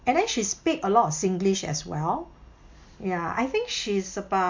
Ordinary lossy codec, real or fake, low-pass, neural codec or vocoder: MP3, 48 kbps; real; 7.2 kHz; none